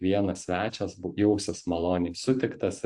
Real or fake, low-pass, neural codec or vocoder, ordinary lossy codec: fake; 10.8 kHz; vocoder, 44.1 kHz, 128 mel bands every 512 samples, BigVGAN v2; AAC, 64 kbps